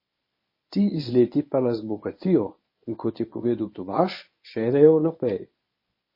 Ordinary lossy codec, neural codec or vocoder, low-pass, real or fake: MP3, 24 kbps; codec, 24 kHz, 0.9 kbps, WavTokenizer, medium speech release version 1; 5.4 kHz; fake